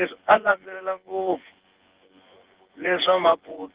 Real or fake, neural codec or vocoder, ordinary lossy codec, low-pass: fake; vocoder, 24 kHz, 100 mel bands, Vocos; Opus, 16 kbps; 3.6 kHz